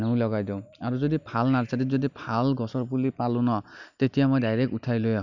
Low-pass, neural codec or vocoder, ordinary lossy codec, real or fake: 7.2 kHz; none; none; real